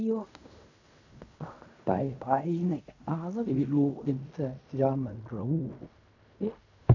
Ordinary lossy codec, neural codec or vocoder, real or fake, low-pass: none; codec, 16 kHz in and 24 kHz out, 0.4 kbps, LongCat-Audio-Codec, fine tuned four codebook decoder; fake; 7.2 kHz